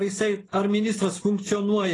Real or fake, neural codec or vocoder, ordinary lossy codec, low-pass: real; none; AAC, 32 kbps; 10.8 kHz